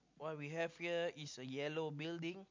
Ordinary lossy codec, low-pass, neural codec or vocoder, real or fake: MP3, 48 kbps; 7.2 kHz; none; real